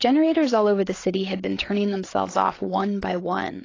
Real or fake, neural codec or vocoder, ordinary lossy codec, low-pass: fake; codec, 16 kHz, 8 kbps, FreqCodec, larger model; AAC, 32 kbps; 7.2 kHz